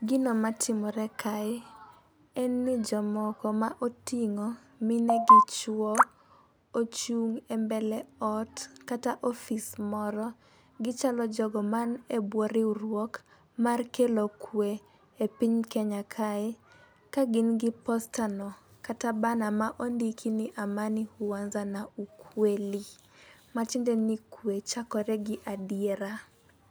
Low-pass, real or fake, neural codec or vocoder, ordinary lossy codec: none; real; none; none